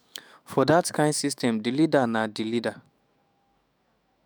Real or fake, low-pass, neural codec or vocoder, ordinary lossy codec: fake; none; autoencoder, 48 kHz, 128 numbers a frame, DAC-VAE, trained on Japanese speech; none